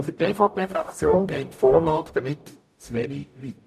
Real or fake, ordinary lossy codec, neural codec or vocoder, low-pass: fake; none; codec, 44.1 kHz, 0.9 kbps, DAC; 14.4 kHz